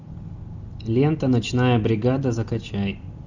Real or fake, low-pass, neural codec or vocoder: real; 7.2 kHz; none